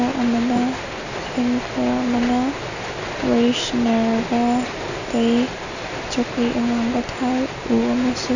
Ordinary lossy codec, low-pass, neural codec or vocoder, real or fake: none; 7.2 kHz; none; real